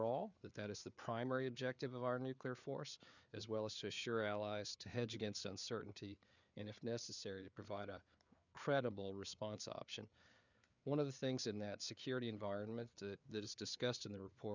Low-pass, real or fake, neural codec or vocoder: 7.2 kHz; fake; codec, 16 kHz, 4 kbps, FreqCodec, larger model